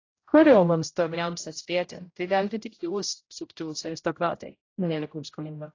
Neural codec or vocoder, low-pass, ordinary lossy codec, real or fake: codec, 16 kHz, 0.5 kbps, X-Codec, HuBERT features, trained on general audio; 7.2 kHz; AAC, 32 kbps; fake